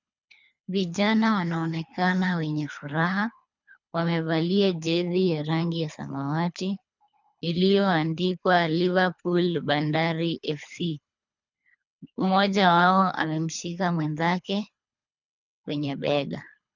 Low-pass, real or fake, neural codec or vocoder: 7.2 kHz; fake; codec, 24 kHz, 3 kbps, HILCodec